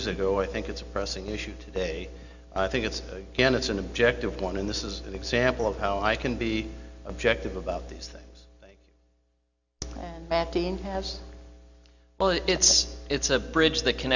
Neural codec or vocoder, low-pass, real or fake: none; 7.2 kHz; real